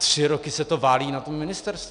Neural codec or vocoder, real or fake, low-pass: none; real; 9.9 kHz